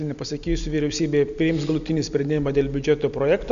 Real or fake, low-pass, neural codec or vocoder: real; 7.2 kHz; none